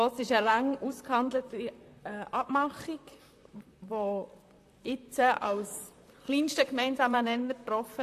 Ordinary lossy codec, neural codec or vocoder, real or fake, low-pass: AAC, 64 kbps; vocoder, 44.1 kHz, 128 mel bands, Pupu-Vocoder; fake; 14.4 kHz